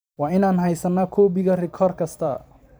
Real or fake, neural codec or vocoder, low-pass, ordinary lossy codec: fake; vocoder, 44.1 kHz, 128 mel bands every 512 samples, BigVGAN v2; none; none